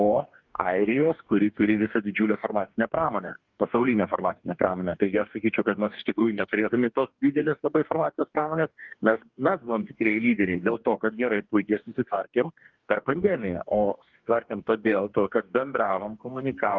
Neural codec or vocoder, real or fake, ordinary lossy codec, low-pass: codec, 44.1 kHz, 2.6 kbps, DAC; fake; Opus, 32 kbps; 7.2 kHz